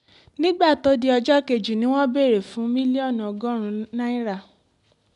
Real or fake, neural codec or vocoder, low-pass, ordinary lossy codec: real; none; 10.8 kHz; none